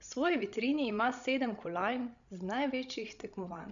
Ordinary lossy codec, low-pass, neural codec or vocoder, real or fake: none; 7.2 kHz; codec, 16 kHz, 16 kbps, FreqCodec, larger model; fake